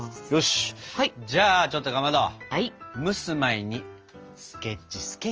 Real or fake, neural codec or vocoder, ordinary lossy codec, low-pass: real; none; Opus, 24 kbps; 7.2 kHz